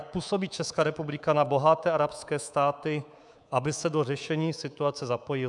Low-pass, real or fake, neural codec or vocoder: 10.8 kHz; fake; codec, 24 kHz, 3.1 kbps, DualCodec